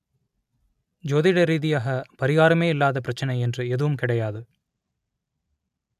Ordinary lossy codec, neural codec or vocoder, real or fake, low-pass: none; none; real; 14.4 kHz